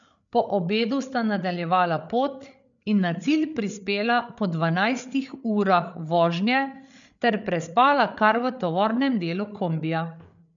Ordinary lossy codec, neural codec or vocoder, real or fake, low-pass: none; codec, 16 kHz, 8 kbps, FreqCodec, larger model; fake; 7.2 kHz